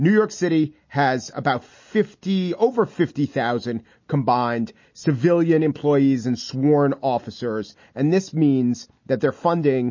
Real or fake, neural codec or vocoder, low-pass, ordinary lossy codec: real; none; 7.2 kHz; MP3, 32 kbps